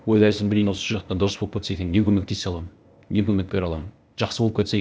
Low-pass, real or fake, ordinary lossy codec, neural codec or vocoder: none; fake; none; codec, 16 kHz, 0.8 kbps, ZipCodec